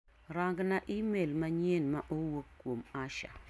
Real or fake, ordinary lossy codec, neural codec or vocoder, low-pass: real; none; none; none